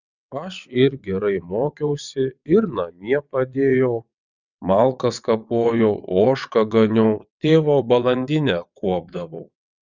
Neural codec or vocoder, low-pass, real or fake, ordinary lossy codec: vocoder, 22.05 kHz, 80 mel bands, WaveNeXt; 7.2 kHz; fake; Opus, 64 kbps